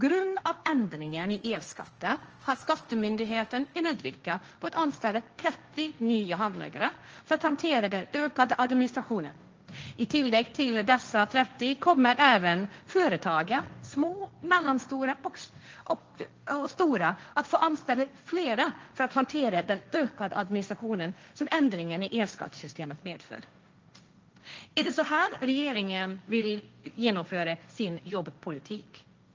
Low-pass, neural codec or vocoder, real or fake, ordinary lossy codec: 7.2 kHz; codec, 16 kHz, 1.1 kbps, Voila-Tokenizer; fake; Opus, 24 kbps